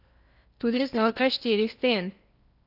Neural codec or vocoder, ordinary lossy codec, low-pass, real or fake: codec, 16 kHz in and 24 kHz out, 0.8 kbps, FocalCodec, streaming, 65536 codes; none; 5.4 kHz; fake